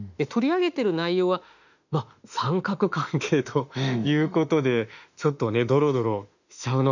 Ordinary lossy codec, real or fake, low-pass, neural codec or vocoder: none; fake; 7.2 kHz; autoencoder, 48 kHz, 32 numbers a frame, DAC-VAE, trained on Japanese speech